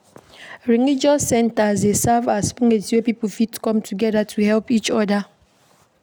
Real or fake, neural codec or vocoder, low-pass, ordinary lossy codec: real; none; none; none